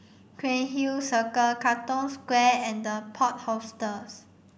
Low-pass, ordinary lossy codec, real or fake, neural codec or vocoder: none; none; real; none